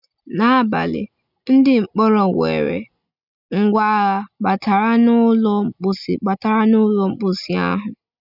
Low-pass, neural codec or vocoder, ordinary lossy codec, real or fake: 5.4 kHz; none; none; real